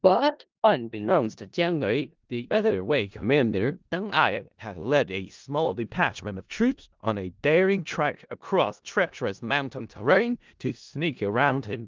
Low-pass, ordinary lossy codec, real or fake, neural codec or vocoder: 7.2 kHz; Opus, 24 kbps; fake; codec, 16 kHz in and 24 kHz out, 0.4 kbps, LongCat-Audio-Codec, four codebook decoder